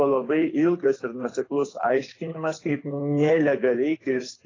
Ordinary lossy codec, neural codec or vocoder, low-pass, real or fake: AAC, 32 kbps; codec, 24 kHz, 6 kbps, HILCodec; 7.2 kHz; fake